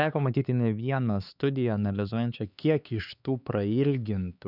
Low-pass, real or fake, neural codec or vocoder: 5.4 kHz; fake; codec, 16 kHz, 4 kbps, X-Codec, HuBERT features, trained on LibriSpeech